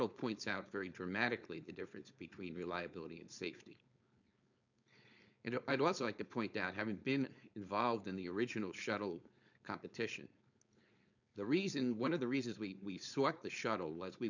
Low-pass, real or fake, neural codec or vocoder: 7.2 kHz; fake; codec, 16 kHz, 4.8 kbps, FACodec